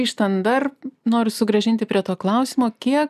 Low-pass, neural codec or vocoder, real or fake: 14.4 kHz; none; real